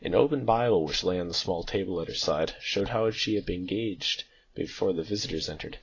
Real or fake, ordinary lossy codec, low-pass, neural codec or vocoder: real; AAC, 32 kbps; 7.2 kHz; none